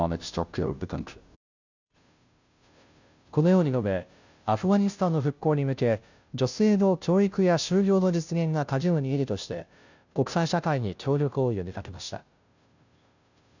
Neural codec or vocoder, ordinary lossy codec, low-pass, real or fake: codec, 16 kHz, 0.5 kbps, FunCodec, trained on Chinese and English, 25 frames a second; none; 7.2 kHz; fake